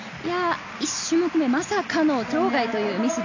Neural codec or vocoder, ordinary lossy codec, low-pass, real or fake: none; AAC, 48 kbps; 7.2 kHz; real